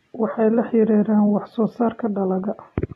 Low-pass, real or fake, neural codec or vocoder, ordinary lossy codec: 19.8 kHz; real; none; AAC, 32 kbps